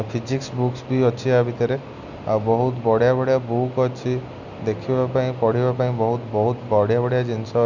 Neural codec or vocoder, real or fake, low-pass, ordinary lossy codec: none; real; 7.2 kHz; none